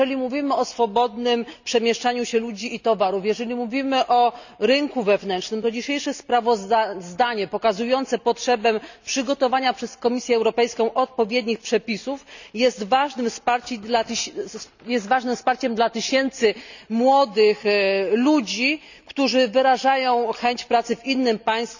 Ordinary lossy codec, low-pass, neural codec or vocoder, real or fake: none; 7.2 kHz; none; real